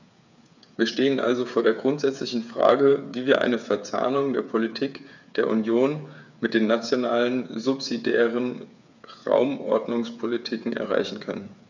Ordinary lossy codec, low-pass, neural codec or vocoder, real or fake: none; 7.2 kHz; codec, 16 kHz, 8 kbps, FreqCodec, smaller model; fake